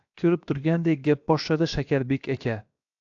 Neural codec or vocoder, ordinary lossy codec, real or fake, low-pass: codec, 16 kHz, 0.7 kbps, FocalCodec; AAC, 64 kbps; fake; 7.2 kHz